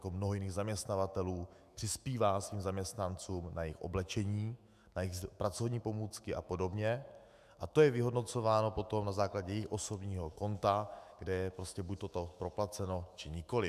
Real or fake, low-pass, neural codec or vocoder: fake; 14.4 kHz; autoencoder, 48 kHz, 128 numbers a frame, DAC-VAE, trained on Japanese speech